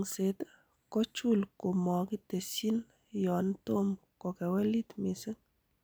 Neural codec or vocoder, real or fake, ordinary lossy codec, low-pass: none; real; none; none